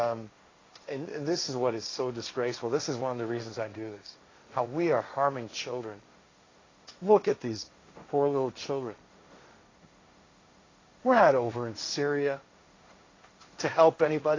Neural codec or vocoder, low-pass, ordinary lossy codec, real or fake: codec, 16 kHz, 1.1 kbps, Voila-Tokenizer; 7.2 kHz; AAC, 32 kbps; fake